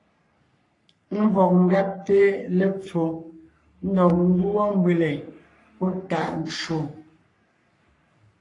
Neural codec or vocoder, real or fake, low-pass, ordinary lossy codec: codec, 44.1 kHz, 3.4 kbps, Pupu-Codec; fake; 10.8 kHz; AAC, 48 kbps